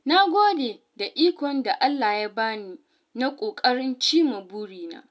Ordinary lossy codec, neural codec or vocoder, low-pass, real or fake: none; none; none; real